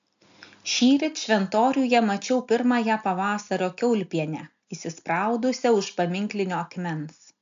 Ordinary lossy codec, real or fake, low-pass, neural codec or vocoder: AAC, 64 kbps; real; 7.2 kHz; none